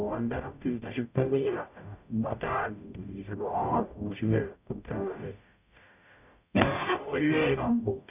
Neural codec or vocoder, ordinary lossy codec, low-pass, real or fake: codec, 44.1 kHz, 0.9 kbps, DAC; none; 3.6 kHz; fake